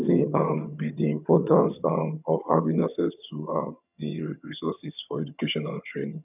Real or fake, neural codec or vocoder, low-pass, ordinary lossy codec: fake; vocoder, 22.05 kHz, 80 mel bands, HiFi-GAN; 3.6 kHz; none